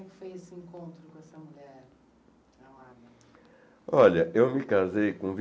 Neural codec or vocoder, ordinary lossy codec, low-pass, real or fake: none; none; none; real